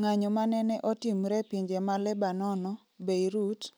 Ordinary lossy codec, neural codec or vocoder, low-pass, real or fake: none; none; none; real